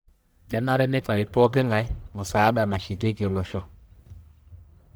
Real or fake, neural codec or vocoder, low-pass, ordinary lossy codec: fake; codec, 44.1 kHz, 1.7 kbps, Pupu-Codec; none; none